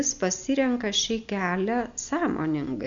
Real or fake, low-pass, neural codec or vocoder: real; 7.2 kHz; none